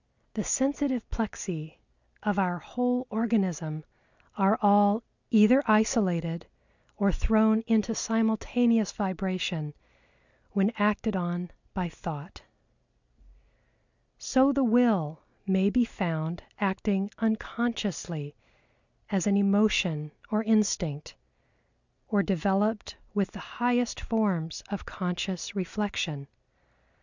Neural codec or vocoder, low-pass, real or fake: none; 7.2 kHz; real